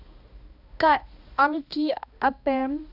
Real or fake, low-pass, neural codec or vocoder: fake; 5.4 kHz; codec, 16 kHz, 1 kbps, X-Codec, HuBERT features, trained on balanced general audio